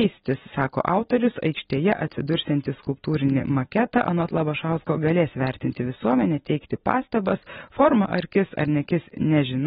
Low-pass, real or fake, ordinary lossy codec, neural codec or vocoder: 19.8 kHz; real; AAC, 16 kbps; none